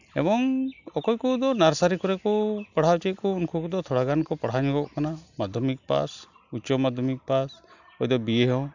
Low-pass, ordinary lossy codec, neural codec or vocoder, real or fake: 7.2 kHz; none; none; real